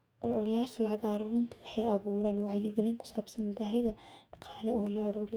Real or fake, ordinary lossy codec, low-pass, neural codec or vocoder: fake; none; none; codec, 44.1 kHz, 2.6 kbps, DAC